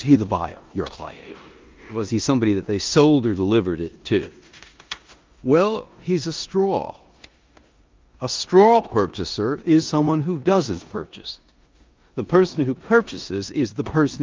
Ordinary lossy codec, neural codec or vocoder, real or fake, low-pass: Opus, 24 kbps; codec, 16 kHz in and 24 kHz out, 0.9 kbps, LongCat-Audio-Codec, four codebook decoder; fake; 7.2 kHz